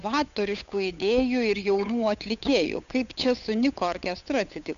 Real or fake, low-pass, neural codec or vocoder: fake; 7.2 kHz; codec, 16 kHz, 8 kbps, FunCodec, trained on Chinese and English, 25 frames a second